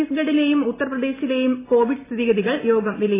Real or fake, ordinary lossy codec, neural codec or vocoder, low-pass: real; AAC, 16 kbps; none; 3.6 kHz